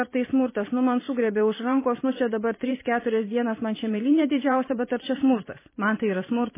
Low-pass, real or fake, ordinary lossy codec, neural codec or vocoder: 3.6 kHz; fake; MP3, 16 kbps; vocoder, 44.1 kHz, 128 mel bands every 512 samples, BigVGAN v2